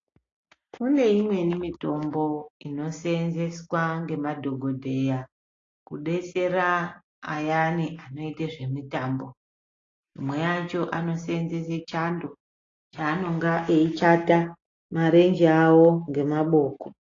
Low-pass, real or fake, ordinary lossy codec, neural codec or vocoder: 7.2 kHz; real; AAC, 32 kbps; none